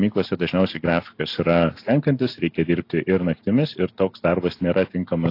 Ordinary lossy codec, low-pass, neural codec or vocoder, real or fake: AAC, 32 kbps; 5.4 kHz; none; real